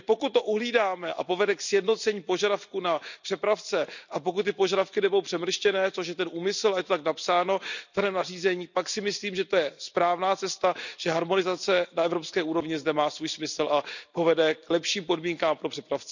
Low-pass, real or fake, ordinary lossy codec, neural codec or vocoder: 7.2 kHz; real; none; none